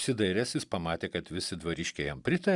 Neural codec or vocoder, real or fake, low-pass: none; real; 10.8 kHz